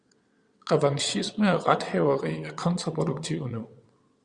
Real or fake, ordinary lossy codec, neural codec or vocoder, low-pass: fake; Opus, 64 kbps; vocoder, 22.05 kHz, 80 mel bands, WaveNeXt; 9.9 kHz